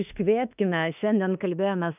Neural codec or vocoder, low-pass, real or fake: autoencoder, 48 kHz, 32 numbers a frame, DAC-VAE, trained on Japanese speech; 3.6 kHz; fake